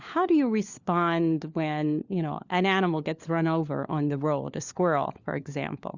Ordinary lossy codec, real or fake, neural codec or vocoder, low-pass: Opus, 64 kbps; fake; codec, 16 kHz, 4 kbps, FunCodec, trained on LibriTTS, 50 frames a second; 7.2 kHz